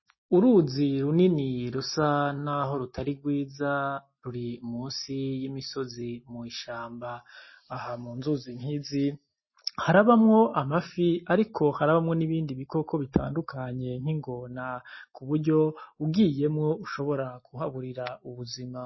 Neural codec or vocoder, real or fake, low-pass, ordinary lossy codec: none; real; 7.2 kHz; MP3, 24 kbps